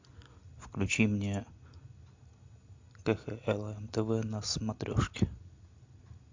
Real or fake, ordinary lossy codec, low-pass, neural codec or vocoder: real; MP3, 64 kbps; 7.2 kHz; none